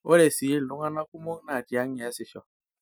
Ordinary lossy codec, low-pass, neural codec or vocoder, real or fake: none; none; none; real